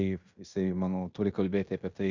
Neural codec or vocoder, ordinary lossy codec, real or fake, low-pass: codec, 16 kHz in and 24 kHz out, 0.9 kbps, LongCat-Audio-Codec, fine tuned four codebook decoder; Opus, 64 kbps; fake; 7.2 kHz